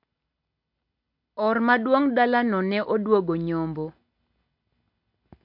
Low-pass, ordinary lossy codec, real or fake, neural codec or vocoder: 5.4 kHz; MP3, 48 kbps; real; none